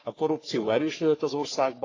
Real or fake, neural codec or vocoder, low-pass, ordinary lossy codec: fake; codec, 44.1 kHz, 3.4 kbps, Pupu-Codec; 7.2 kHz; AAC, 32 kbps